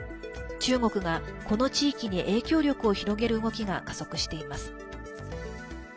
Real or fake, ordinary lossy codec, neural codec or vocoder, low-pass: real; none; none; none